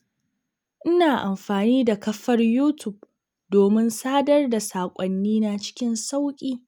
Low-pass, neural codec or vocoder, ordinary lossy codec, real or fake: none; none; none; real